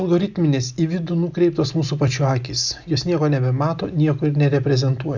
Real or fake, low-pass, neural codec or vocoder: real; 7.2 kHz; none